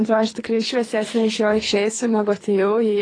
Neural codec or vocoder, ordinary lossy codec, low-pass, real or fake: codec, 24 kHz, 3 kbps, HILCodec; AAC, 32 kbps; 9.9 kHz; fake